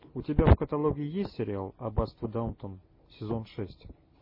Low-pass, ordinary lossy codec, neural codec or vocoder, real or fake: 5.4 kHz; MP3, 24 kbps; none; real